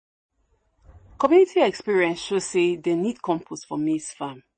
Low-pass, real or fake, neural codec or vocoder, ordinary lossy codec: 9.9 kHz; real; none; MP3, 32 kbps